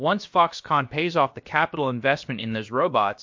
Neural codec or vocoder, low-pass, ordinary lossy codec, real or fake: codec, 16 kHz, about 1 kbps, DyCAST, with the encoder's durations; 7.2 kHz; MP3, 48 kbps; fake